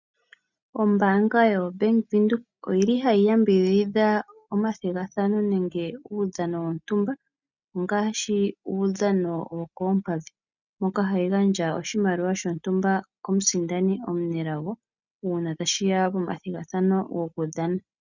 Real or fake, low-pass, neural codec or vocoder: real; 7.2 kHz; none